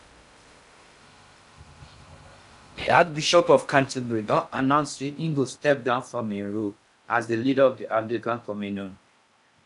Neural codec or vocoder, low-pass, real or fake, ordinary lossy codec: codec, 16 kHz in and 24 kHz out, 0.6 kbps, FocalCodec, streaming, 2048 codes; 10.8 kHz; fake; none